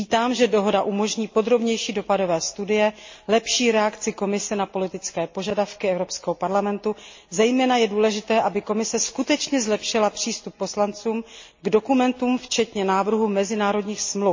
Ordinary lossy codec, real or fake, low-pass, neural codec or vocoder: MP3, 32 kbps; real; 7.2 kHz; none